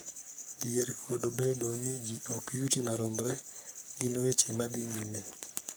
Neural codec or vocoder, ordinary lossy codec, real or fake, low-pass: codec, 44.1 kHz, 3.4 kbps, Pupu-Codec; none; fake; none